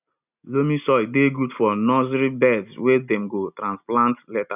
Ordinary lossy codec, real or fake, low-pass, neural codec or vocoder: none; real; 3.6 kHz; none